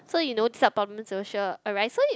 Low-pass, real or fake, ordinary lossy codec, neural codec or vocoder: none; real; none; none